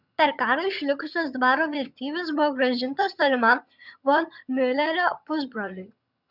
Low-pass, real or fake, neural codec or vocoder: 5.4 kHz; fake; vocoder, 22.05 kHz, 80 mel bands, HiFi-GAN